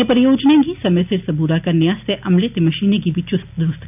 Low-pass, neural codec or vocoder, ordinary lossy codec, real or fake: 3.6 kHz; none; none; real